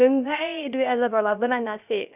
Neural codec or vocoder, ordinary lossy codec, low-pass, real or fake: codec, 16 kHz, 0.3 kbps, FocalCodec; none; 3.6 kHz; fake